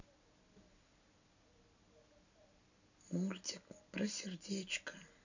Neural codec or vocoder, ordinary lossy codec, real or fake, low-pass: none; none; real; 7.2 kHz